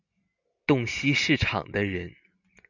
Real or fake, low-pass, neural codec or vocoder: real; 7.2 kHz; none